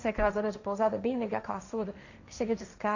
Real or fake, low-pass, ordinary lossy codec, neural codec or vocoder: fake; 7.2 kHz; none; codec, 16 kHz, 1.1 kbps, Voila-Tokenizer